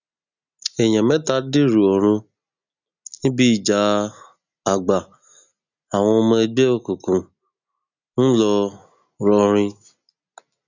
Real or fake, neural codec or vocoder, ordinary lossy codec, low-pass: real; none; none; 7.2 kHz